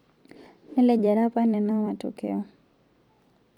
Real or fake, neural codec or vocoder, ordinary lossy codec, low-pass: fake; vocoder, 44.1 kHz, 128 mel bands every 256 samples, BigVGAN v2; none; 19.8 kHz